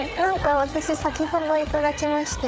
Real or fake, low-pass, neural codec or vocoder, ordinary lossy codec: fake; none; codec, 16 kHz, 8 kbps, FreqCodec, larger model; none